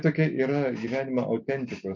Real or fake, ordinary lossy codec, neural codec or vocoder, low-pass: real; MP3, 64 kbps; none; 7.2 kHz